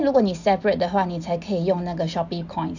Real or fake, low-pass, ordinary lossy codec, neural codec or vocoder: real; 7.2 kHz; none; none